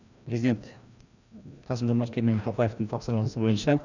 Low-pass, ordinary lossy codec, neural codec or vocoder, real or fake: 7.2 kHz; none; codec, 16 kHz, 1 kbps, FreqCodec, larger model; fake